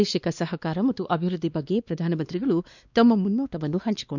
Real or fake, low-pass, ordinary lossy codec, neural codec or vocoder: fake; 7.2 kHz; none; codec, 16 kHz, 2 kbps, X-Codec, WavLM features, trained on Multilingual LibriSpeech